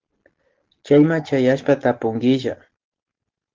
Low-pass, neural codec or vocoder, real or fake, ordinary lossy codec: 7.2 kHz; none; real; Opus, 16 kbps